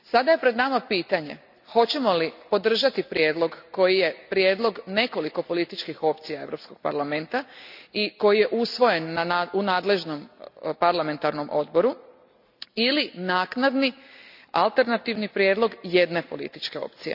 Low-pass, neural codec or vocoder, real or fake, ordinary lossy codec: 5.4 kHz; none; real; none